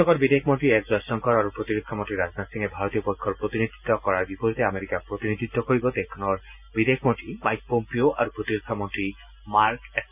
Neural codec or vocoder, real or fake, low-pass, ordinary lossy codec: none; real; 3.6 kHz; none